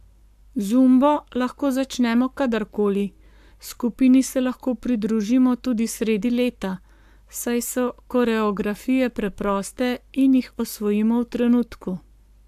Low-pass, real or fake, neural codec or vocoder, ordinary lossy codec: 14.4 kHz; fake; codec, 44.1 kHz, 7.8 kbps, Pupu-Codec; AAC, 96 kbps